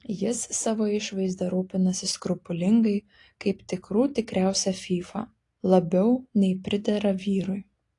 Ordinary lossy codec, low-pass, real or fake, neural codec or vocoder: AAC, 48 kbps; 10.8 kHz; real; none